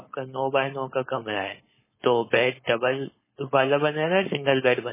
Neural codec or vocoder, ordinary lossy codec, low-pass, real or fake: codec, 16 kHz, 4.8 kbps, FACodec; MP3, 16 kbps; 3.6 kHz; fake